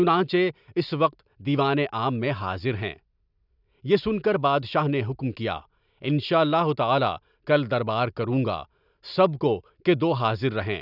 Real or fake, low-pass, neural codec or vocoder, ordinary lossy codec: real; 5.4 kHz; none; none